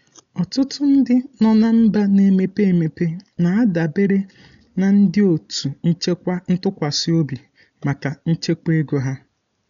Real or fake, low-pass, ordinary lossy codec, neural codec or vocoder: real; 7.2 kHz; none; none